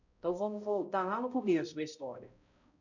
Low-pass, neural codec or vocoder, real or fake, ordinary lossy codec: 7.2 kHz; codec, 16 kHz, 0.5 kbps, X-Codec, HuBERT features, trained on balanced general audio; fake; none